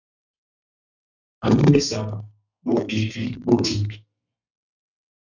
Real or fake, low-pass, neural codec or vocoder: fake; 7.2 kHz; codec, 32 kHz, 1.9 kbps, SNAC